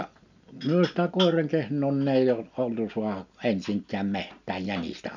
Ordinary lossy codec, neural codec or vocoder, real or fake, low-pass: none; none; real; 7.2 kHz